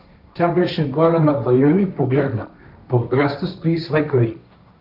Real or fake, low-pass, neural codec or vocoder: fake; 5.4 kHz; codec, 16 kHz, 1.1 kbps, Voila-Tokenizer